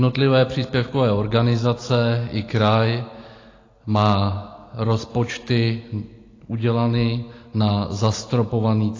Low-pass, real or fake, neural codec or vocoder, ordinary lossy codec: 7.2 kHz; real; none; AAC, 32 kbps